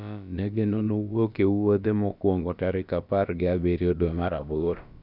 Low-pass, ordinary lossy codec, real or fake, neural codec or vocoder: 5.4 kHz; none; fake; codec, 16 kHz, about 1 kbps, DyCAST, with the encoder's durations